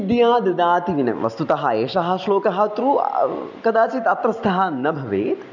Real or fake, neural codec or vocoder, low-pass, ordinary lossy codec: real; none; 7.2 kHz; none